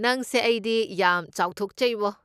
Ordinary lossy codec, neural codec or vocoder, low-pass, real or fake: none; none; 14.4 kHz; real